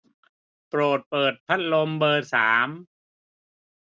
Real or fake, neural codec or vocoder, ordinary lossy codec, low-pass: real; none; none; none